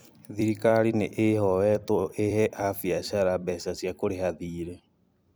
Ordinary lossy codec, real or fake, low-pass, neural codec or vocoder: none; fake; none; vocoder, 44.1 kHz, 128 mel bands every 256 samples, BigVGAN v2